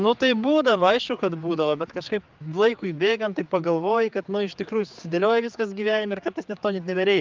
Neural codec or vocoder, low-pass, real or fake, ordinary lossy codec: codec, 16 kHz, 4 kbps, X-Codec, HuBERT features, trained on general audio; 7.2 kHz; fake; Opus, 24 kbps